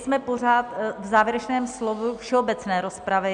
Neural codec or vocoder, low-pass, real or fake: none; 9.9 kHz; real